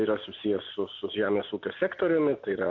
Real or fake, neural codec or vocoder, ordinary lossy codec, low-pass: real; none; MP3, 64 kbps; 7.2 kHz